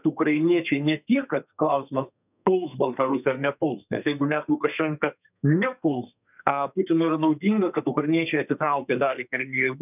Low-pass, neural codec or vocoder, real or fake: 3.6 kHz; codec, 32 kHz, 1.9 kbps, SNAC; fake